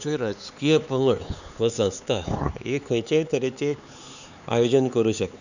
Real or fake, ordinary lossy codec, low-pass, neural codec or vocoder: fake; none; 7.2 kHz; codec, 16 kHz, 4 kbps, X-Codec, HuBERT features, trained on LibriSpeech